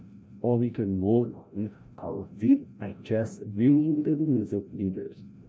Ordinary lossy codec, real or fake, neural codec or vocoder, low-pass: none; fake; codec, 16 kHz, 0.5 kbps, FreqCodec, larger model; none